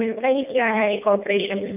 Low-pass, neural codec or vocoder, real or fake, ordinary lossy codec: 3.6 kHz; codec, 24 kHz, 1.5 kbps, HILCodec; fake; none